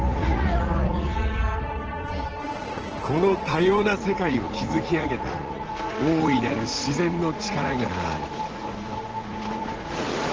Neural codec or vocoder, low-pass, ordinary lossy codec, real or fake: vocoder, 44.1 kHz, 80 mel bands, Vocos; 7.2 kHz; Opus, 16 kbps; fake